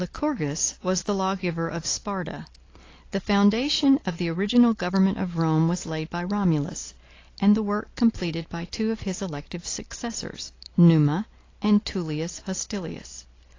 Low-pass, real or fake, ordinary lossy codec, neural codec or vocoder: 7.2 kHz; real; AAC, 32 kbps; none